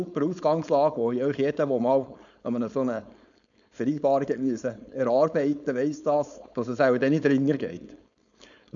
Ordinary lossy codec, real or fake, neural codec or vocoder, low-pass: none; fake; codec, 16 kHz, 4.8 kbps, FACodec; 7.2 kHz